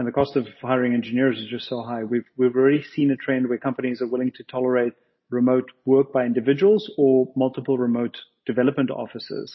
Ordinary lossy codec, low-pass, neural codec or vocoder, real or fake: MP3, 24 kbps; 7.2 kHz; none; real